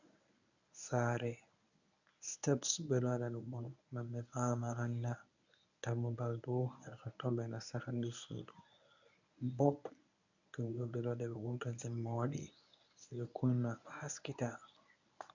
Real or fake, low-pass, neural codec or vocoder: fake; 7.2 kHz; codec, 24 kHz, 0.9 kbps, WavTokenizer, medium speech release version 1